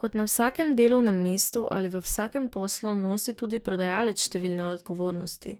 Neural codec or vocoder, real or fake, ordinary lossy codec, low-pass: codec, 44.1 kHz, 2.6 kbps, DAC; fake; none; none